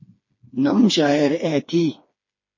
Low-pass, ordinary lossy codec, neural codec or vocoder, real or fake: 7.2 kHz; MP3, 32 kbps; codec, 16 kHz, 4 kbps, FreqCodec, smaller model; fake